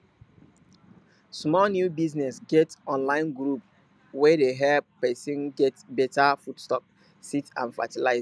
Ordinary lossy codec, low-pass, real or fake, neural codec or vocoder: none; none; real; none